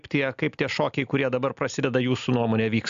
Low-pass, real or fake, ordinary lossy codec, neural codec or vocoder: 7.2 kHz; real; AAC, 96 kbps; none